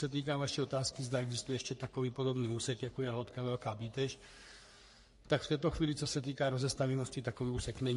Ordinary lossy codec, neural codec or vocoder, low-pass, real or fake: MP3, 48 kbps; codec, 44.1 kHz, 3.4 kbps, Pupu-Codec; 14.4 kHz; fake